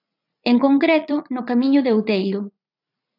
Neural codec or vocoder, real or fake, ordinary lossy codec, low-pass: vocoder, 44.1 kHz, 80 mel bands, Vocos; fake; AAC, 48 kbps; 5.4 kHz